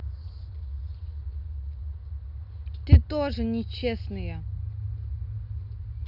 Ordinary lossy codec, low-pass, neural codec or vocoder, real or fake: none; 5.4 kHz; none; real